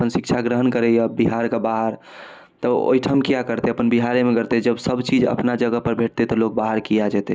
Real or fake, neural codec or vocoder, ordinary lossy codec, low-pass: real; none; none; none